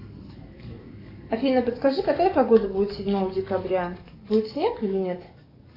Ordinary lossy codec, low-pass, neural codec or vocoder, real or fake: AAC, 32 kbps; 5.4 kHz; codec, 44.1 kHz, 7.8 kbps, DAC; fake